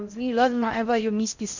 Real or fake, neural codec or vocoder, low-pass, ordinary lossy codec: fake; codec, 16 kHz in and 24 kHz out, 0.6 kbps, FocalCodec, streaming, 4096 codes; 7.2 kHz; none